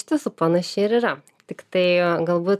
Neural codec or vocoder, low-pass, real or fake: none; 14.4 kHz; real